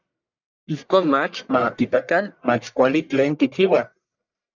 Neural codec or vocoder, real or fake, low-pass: codec, 44.1 kHz, 1.7 kbps, Pupu-Codec; fake; 7.2 kHz